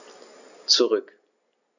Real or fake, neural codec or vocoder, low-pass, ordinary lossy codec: real; none; 7.2 kHz; none